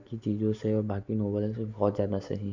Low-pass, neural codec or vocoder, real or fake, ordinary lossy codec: 7.2 kHz; none; real; Opus, 64 kbps